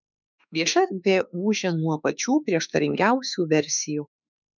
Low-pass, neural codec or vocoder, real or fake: 7.2 kHz; autoencoder, 48 kHz, 32 numbers a frame, DAC-VAE, trained on Japanese speech; fake